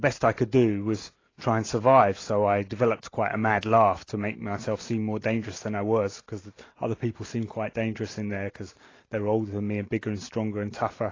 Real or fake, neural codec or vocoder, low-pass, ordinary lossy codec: real; none; 7.2 kHz; AAC, 32 kbps